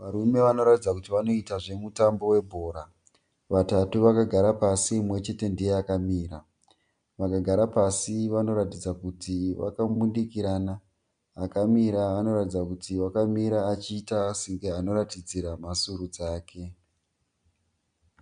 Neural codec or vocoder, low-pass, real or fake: none; 9.9 kHz; real